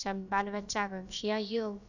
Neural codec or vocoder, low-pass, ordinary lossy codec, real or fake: codec, 16 kHz, about 1 kbps, DyCAST, with the encoder's durations; 7.2 kHz; none; fake